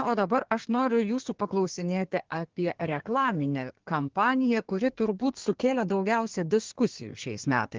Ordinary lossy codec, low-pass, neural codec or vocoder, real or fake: Opus, 16 kbps; 7.2 kHz; codec, 32 kHz, 1.9 kbps, SNAC; fake